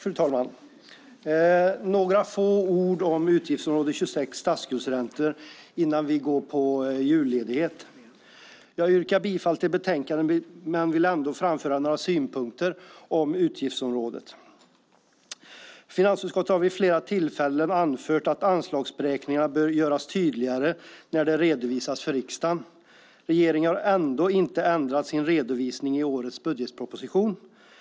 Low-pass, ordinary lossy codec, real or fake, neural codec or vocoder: none; none; real; none